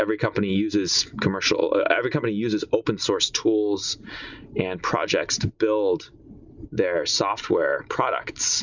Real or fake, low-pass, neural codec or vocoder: real; 7.2 kHz; none